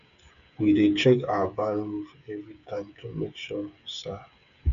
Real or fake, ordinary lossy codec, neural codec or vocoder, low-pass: fake; none; codec, 16 kHz, 8 kbps, FreqCodec, smaller model; 7.2 kHz